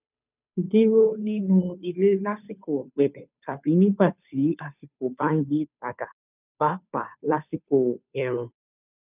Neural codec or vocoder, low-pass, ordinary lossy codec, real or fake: codec, 16 kHz, 2 kbps, FunCodec, trained on Chinese and English, 25 frames a second; 3.6 kHz; none; fake